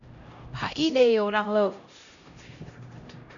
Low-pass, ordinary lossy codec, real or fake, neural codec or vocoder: 7.2 kHz; MP3, 96 kbps; fake; codec, 16 kHz, 0.5 kbps, X-Codec, HuBERT features, trained on LibriSpeech